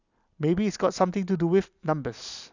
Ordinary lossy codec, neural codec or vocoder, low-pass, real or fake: none; none; 7.2 kHz; real